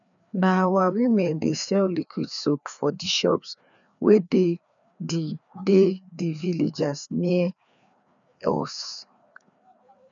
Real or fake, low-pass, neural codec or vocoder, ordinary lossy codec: fake; 7.2 kHz; codec, 16 kHz, 2 kbps, FreqCodec, larger model; none